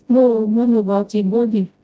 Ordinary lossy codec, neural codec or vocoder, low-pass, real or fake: none; codec, 16 kHz, 0.5 kbps, FreqCodec, smaller model; none; fake